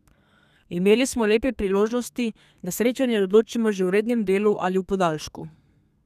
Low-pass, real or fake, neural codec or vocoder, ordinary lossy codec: 14.4 kHz; fake; codec, 32 kHz, 1.9 kbps, SNAC; none